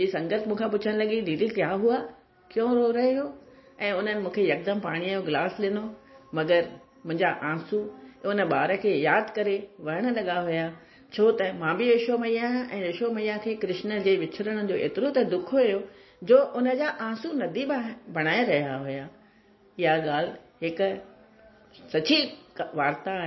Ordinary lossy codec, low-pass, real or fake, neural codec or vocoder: MP3, 24 kbps; 7.2 kHz; real; none